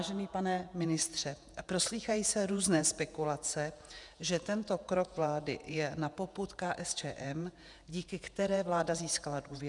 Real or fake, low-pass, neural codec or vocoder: fake; 10.8 kHz; vocoder, 48 kHz, 128 mel bands, Vocos